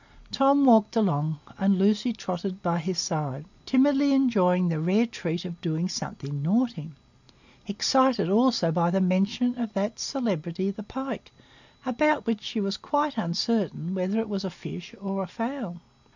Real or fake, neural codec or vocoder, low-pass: real; none; 7.2 kHz